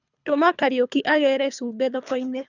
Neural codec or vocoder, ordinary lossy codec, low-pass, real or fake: codec, 24 kHz, 3 kbps, HILCodec; none; 7.2 kHz; fake